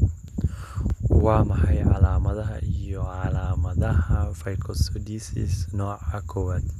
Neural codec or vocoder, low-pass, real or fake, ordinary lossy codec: none; 14.4 kHz; real; none